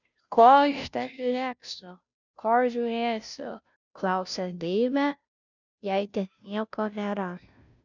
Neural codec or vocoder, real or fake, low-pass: codec, 16 kHz, 0.5 kbps, FunCodec, trained on Chinese and English, 25 frames a second; fake; 7.2 kHz